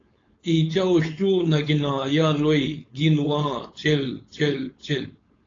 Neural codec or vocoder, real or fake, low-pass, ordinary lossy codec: codec, 16 kHz, 4.8 kbps, FACodec; fake; 7.2 kHz; AAC, 32 kbps